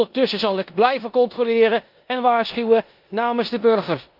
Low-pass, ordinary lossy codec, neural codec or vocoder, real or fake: 5.4 kHz; Opus, 24 kbps; codec, 16 kHz in and 24 kHz out, 0.9 kbps, LongCat-Audio-Codec, four codebook decoder; fake